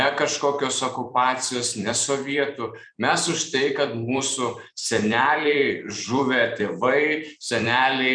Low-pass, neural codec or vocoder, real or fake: 9.9 kHz; vocoder, 44.1 kHz, 128 mel bands every 256 samples, BigVGAN v2; fake